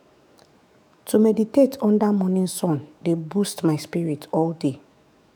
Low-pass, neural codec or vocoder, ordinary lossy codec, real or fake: 19.8 kHz; autoencoder, 48 kHz, 128 numbers a frame, DAC-VAE, trained on Japanese speech; none; fake